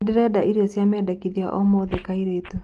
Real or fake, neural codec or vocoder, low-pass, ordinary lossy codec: real; none; 10.8 kHz; Opus, 24 kbps